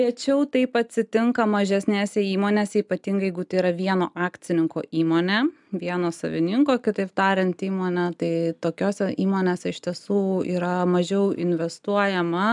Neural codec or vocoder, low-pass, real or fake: none; 10.8 kHz; real